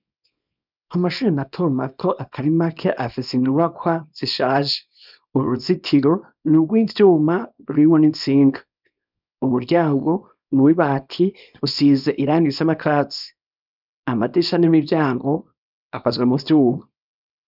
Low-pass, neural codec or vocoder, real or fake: 5.4 kHz; codec, 24 kHz, 0.9 kbps, WavTokenizer, small release; fake